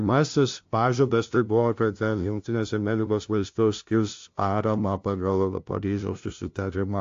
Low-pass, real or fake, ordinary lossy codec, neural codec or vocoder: 7.2 kHz; fake; AAC, 48 kbps; codec, 16 kHz, 0.5 kbps, FunCodec, trained on LibriTTS, 25 frames a second